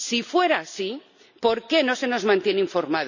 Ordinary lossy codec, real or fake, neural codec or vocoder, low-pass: none; real; none; 7.2 kHz